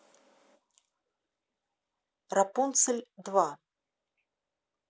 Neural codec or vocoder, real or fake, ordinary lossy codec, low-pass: none; real; none; none